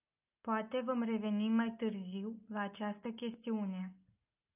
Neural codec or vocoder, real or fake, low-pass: none; real; 3.6 kHz